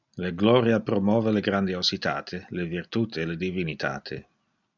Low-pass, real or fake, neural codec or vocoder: 7.2 kHz; real; none